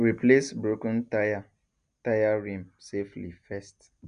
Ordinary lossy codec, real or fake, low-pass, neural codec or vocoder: none; real; 9.9 kHz; none